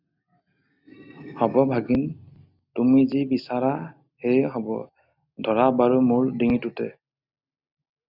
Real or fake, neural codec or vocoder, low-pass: real; none; 5.4 kHz